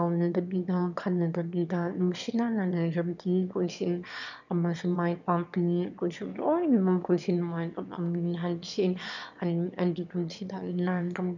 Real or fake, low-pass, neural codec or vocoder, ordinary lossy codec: fake; 7.2 kHz; autoencoder, 22.05 kHz, a latent of 192 numbers a frame, VITS, trained on one speaker; none